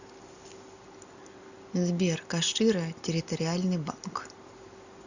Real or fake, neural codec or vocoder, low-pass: real; none; 7.2 kHz